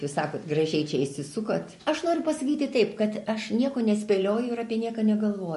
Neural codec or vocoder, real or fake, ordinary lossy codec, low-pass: none; real; MP3, 48 kbps; 14.4 kHz